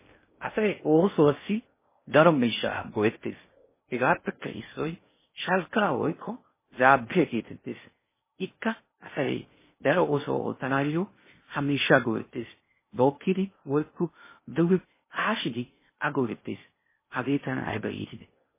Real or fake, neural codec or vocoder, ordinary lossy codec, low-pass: fake; codec, 16 kHz in and 24 kHz out, 0.6 kbps, FocalCodec, streaming, 2048 codes; MP3, 16 kbps; 3.6 kHz